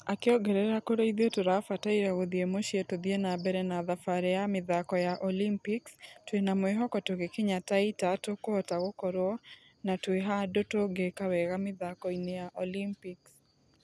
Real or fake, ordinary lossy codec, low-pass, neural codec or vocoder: real; none; none; none